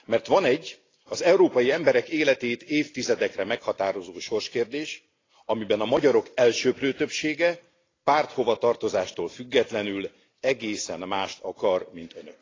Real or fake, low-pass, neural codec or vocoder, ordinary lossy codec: real; 7.2 kHz; none; AAC, 32 kbps